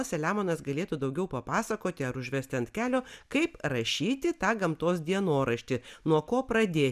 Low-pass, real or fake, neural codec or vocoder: 14.4 kHz; real; none